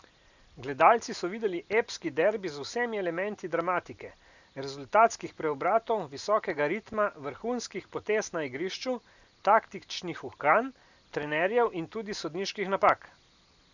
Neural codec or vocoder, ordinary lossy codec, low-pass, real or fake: none; none; 7.2 kHz; real